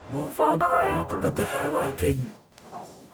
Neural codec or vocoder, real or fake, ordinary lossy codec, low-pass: codec, 44.1 kHz, 0.9 kbps, DAC; fake; none; none